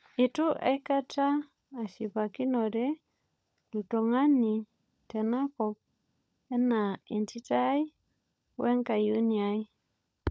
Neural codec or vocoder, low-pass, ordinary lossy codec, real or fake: codec, 16 kHz, 16 kbps, FunCodec, trained on LibriTTS, 50 frames a second; none; none; fake